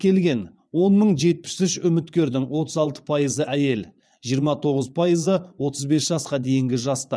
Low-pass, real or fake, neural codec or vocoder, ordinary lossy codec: none; fake; vocoder, 22.05 kHz, 80 mel bands, Vocos; none